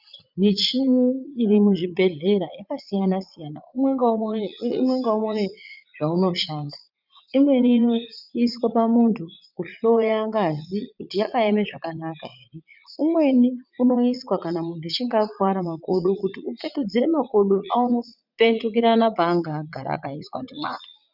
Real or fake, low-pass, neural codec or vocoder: fake; 5.4 kHz; vocoder, 44.1 kHz, 80 mel bands, Vocos